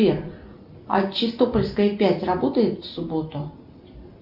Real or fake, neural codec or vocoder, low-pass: real; none; 5.4 kHz